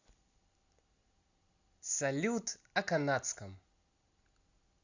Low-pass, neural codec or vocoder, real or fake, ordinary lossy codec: 7.2 kHz; none; real; Opus, 64 kbps